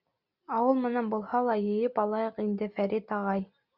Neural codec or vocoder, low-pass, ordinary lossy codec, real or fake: none; 5.4 kHz; Opus, 64 kbps; real